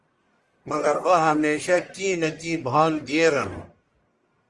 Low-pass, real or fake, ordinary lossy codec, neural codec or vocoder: 10.8 kHz; fake; Opus, 32 kbps; codec, 44.1 kHz, 1.7 kbps, Pupu-Codec